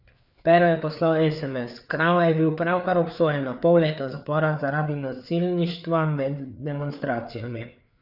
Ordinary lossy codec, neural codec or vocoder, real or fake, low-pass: none; codec, 16 kHz, 4 kbps, FreqCodec, larger model; fake; 5.4 kHz